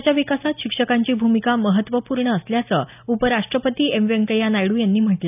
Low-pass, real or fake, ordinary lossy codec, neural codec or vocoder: 3.6 kHz; real; none; none